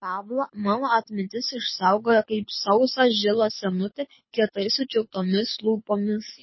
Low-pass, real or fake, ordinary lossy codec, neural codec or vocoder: 7.2 kHz; fake; MP3, 24 kbps; codec, 24 kHz, 6 kbps, HILCodec